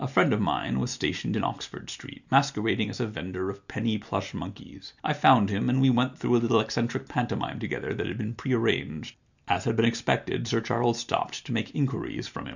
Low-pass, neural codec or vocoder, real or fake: 7.2 kHz; none; real